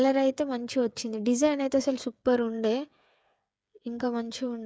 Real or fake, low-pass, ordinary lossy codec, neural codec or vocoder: fake; none; none; codec, 16 kHz, 8 kbps, FreqCodec, smaller model